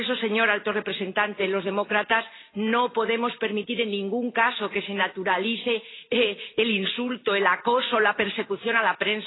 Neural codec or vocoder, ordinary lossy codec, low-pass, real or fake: none; AAC, 16 kbps; 7.2 kHz; real